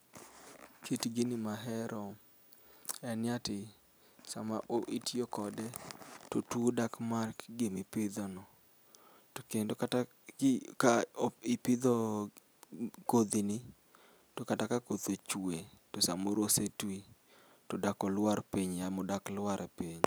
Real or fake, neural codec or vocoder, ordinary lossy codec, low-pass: real; none; none; none